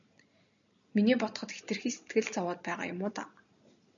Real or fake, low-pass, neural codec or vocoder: real; 7.2 kHz; none